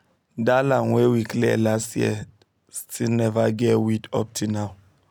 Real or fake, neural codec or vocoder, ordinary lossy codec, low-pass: real; none; none; none